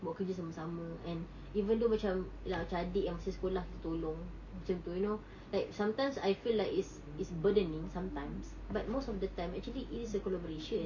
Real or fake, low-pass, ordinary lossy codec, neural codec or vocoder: real; 7.2 kHz; none; none